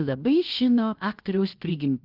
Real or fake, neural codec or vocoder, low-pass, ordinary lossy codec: fake; codec, 16 kHz, 0.7 kbps, FocalCodec; 5.4 kHz; Opus, 32 kbps